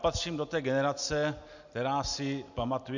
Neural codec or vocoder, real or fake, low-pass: none; real; 7.2 kHz